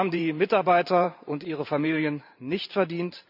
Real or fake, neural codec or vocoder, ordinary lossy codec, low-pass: fake; vocoder, 44.1 kHz, 128 mel bands every 512 samples, BigVGAN v2; none; 5.4 kHz